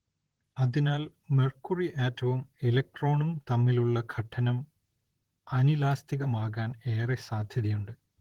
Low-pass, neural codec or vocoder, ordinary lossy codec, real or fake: 19.8 kHz; vocoder, 44.1 kHz, 128 mel bands, Pupu-Vocoder; Opus, 16 kbps; fake